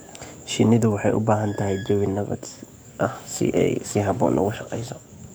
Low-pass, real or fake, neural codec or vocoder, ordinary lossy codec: none; fake; codec, 44.1 kHz, 7.8 kbps, DAC; none